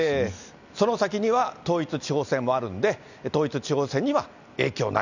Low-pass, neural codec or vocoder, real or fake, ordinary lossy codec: 7.2 kHz; none; real; none